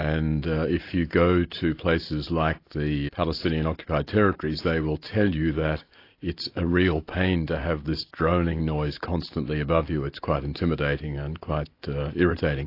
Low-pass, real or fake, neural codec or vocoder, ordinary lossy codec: 5.4 kHz; real; none; AAC, 32 kbps